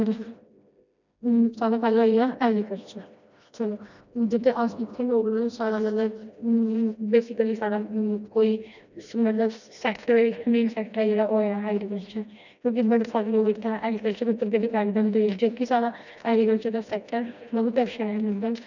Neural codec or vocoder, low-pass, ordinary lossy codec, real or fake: codec, 16 kHz, 1 kbps, FreqCodec, smaller model; 7.2 kHz; none; fake